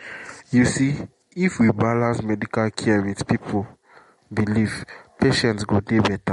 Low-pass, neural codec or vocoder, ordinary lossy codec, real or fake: 19.8 kHz; none; MP3, 48 kbps; real